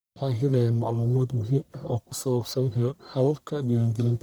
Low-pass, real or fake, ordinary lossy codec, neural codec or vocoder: none; fake; none; codec, 44.1 kHz, 1.7 kbps, Pupu-Codec